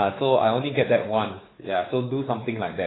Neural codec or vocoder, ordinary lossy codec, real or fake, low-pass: codec, 16 kHz, 4 kbps, X-Codec, WavLM features, trained on Multilingual LibriSpeech; AAC, 16 kbps; fake; 7.2 kHz